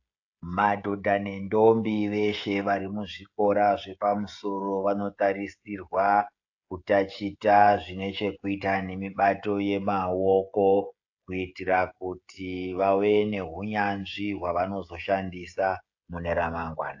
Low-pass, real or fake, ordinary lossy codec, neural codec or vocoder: 7.2 kHz; fake; AAC, 48 kbps; codec, 16 kHz, 16 kbps, FreqCodec, smaller model